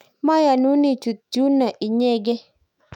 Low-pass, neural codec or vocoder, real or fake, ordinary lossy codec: 19.8 kHz; autoencoder, 48 kHz, 128 numbers a frame, DAC-VAE, trained on Japanese speech; fake; none